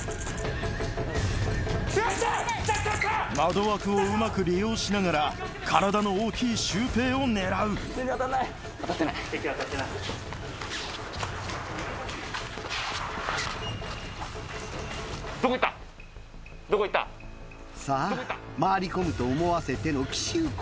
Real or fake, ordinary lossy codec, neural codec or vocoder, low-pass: real; none; none; none